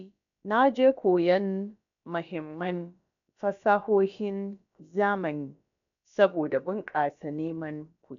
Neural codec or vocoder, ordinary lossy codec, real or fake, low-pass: codec, 16 kHz, about 1 kbps, DyCAST, with the encoder's durations; none; fake; 7.2 kHz